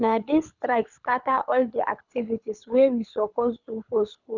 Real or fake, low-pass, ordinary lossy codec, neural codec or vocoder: fake; 7.2 kHz; none; codec, 24 kHz, 6 kbps, HILCodec